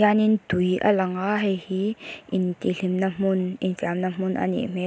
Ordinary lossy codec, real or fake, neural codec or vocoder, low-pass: none; real; none; none